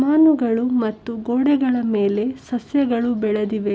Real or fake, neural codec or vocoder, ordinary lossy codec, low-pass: real; none; none; none